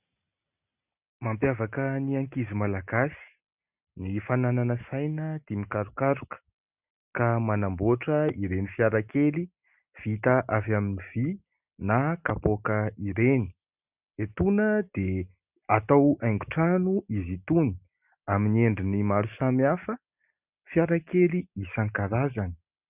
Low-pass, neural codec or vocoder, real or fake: 3.6 kHz; none; real